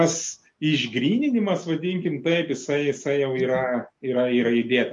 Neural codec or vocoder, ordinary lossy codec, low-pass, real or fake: none; MP3, 48 kbps; 10.8 kHz; real